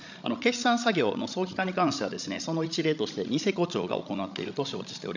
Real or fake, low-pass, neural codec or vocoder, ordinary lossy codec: fake; 7.2 kHz; codec, 16 kHz, 16 kbps, FreqCodec, larger model; none